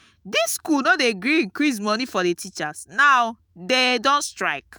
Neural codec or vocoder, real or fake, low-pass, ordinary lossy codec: autoencoder, 48 kHz, 128 numbers a frame, DAC-VAE, trained on Japanese speech; fake; none; none